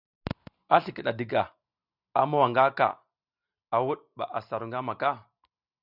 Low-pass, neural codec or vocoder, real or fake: 5.4 kHz; none; real